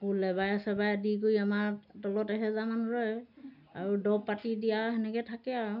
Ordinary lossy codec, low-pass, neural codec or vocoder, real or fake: none; 5.4 kHz; none; real